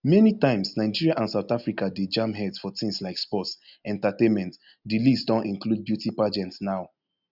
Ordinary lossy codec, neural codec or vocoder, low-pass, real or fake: none; none; 5.4 kHz; real